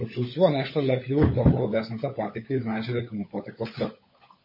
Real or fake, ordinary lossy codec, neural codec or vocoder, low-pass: fake; MP3, 24 kbps; codec, 16 kHz, 16 kbps, FunCodec, trained on LibriTTS, 50 frames a second; 5.4 kHz